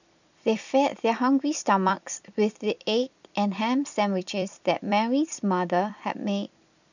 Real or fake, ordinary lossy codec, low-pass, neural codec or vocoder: fake; none; 7.2 kHz; vocoder, 44.1 kHz, 128 mel bands every 256 samples, BigVGAN v2